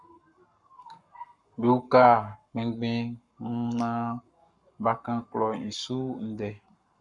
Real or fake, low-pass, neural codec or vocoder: fake; 10.8 kHz; codec, 44.1 kHz, 7.8 kbps, Pupu-Codec